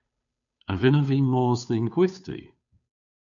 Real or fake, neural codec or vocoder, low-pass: fake; codec, 16 kHz, 2 kbps, FunCodec, trained on Chinese and English, 25 frames a second; 7.2 kHz